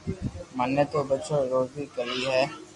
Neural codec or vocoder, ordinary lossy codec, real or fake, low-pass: none; AAC, 64 kbps; real; 10.8 kHz